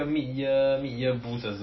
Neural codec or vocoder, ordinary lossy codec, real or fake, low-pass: none; MP3, 24 kbps; real; 7.2 kHz